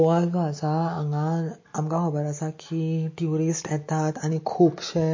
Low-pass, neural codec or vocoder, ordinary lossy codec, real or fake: 7.2 kHz; none; MP3, 32 kbps; real